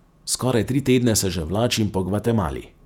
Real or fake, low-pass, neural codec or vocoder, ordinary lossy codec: fake; 19.8 kHz; vocoder, 48 kHz, 128 mel bands, Vocos; none